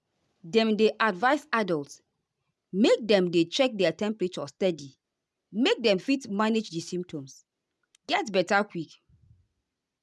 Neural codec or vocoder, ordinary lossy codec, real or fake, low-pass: none; none; real; none